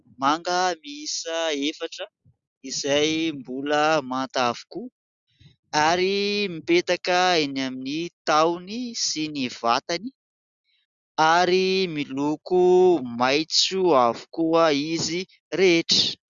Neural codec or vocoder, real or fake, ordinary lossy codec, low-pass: none; real; Opus, 64 kbps; 7.2 kHz